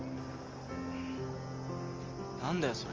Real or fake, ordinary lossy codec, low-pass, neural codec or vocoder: real; Opus, 32 kbps; 7.2 kHz; none